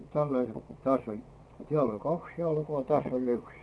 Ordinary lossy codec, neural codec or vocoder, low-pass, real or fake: none; vocoder, 22.05 kHz, 80 mel bands, WaveNeXt; none; fake